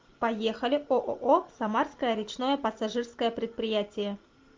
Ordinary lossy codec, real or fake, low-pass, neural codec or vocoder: Opus, 32 kbps; real; 7.2 kHz; none